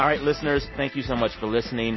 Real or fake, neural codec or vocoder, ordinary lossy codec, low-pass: real; none; MP3, 24 kbps; 7.2 kHz